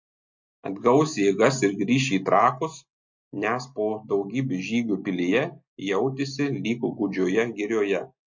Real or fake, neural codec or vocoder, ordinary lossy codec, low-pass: real; none; MP3, 48 kbps; 7.2 kHz